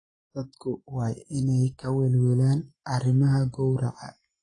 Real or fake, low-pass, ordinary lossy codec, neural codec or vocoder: real; 9.9 kHz; AAC, 32 kbps; none